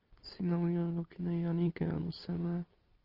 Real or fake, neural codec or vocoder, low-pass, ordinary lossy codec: real; none; 5.4 kHz; Opus, 32 kbps